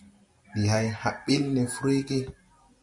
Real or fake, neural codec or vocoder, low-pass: real; none; 10.8 kHz